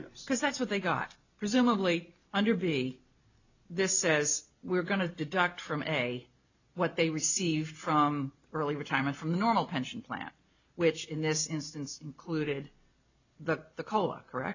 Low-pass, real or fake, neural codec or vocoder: 7.2 kHz; real; none